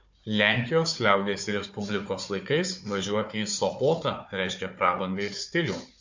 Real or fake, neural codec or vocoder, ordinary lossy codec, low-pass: fake; codec, 16 kHz, 4 kbps, FunCodec, trained on Chinese and English, 50 frames a second; MP3, 48 kbps; 7.2 kHz